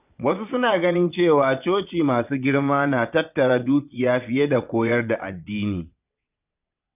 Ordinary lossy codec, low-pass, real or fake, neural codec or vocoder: none; 3.6 kHz; fake; vocoder, 22.05 kHz, 80 mel bands, WaveNeXt